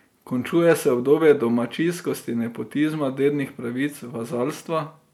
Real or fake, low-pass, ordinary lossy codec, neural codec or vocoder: real; 19.8 kHz; none; none